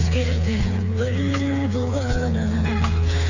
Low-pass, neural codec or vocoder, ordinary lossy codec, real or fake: 7.2 kHz; codec, 16 kHz, 4 kbps, FreqCodec, smaller model; none; fake